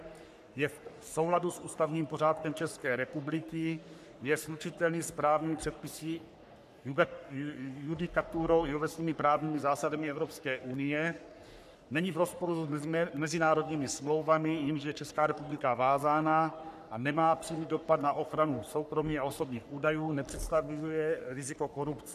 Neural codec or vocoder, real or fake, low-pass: codec, 44.1 kHz, 3.4 kbps, Pupu-Codec; fake; 14.4 kHz